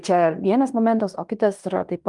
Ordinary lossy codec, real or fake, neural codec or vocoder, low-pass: Opus, 32 kbps; fake; codec, 16 kHz in and 24 kHz out, 0.9 kbps, LongCat-Audio-Codec, fine tuned four codebook decoder; 10.8 kHz